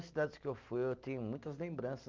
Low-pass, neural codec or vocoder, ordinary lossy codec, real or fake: 7.2 kHz; none; Opus, 32 kbps; real